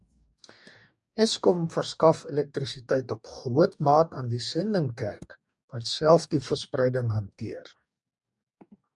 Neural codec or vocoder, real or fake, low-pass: codec, 44.1 kHz, 2.6 kbps, DAC; fake; 10.8 kHz